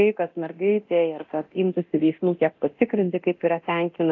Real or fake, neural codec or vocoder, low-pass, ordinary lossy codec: fake; codec, 24 kHz, 0.9 kbps, DualCodec; 7.2 kHz; AAC, 48 kbps